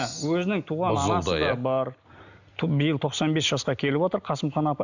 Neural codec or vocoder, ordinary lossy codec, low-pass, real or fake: none; none; 7.2 kHz; real